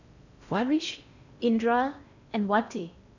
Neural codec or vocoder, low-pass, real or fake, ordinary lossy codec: codec, 16 kHz in and 24 kHz out, 0.6 kbps, FocalCodec, streaming, 4096 codes; 7.2 kHz; fake; none